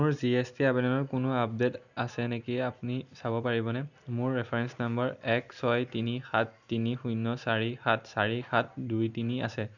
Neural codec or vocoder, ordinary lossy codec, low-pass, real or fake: none; none; 7.2 kHz; real